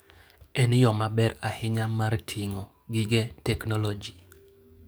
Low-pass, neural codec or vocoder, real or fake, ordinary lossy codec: none; codec, 44.1 kHz, 7.8 kbps, DAC; fake; none